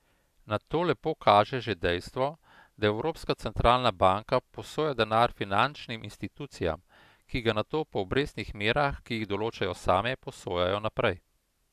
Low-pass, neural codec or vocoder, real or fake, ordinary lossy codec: 14.4 kHz; none; real; AAC, 96 kbps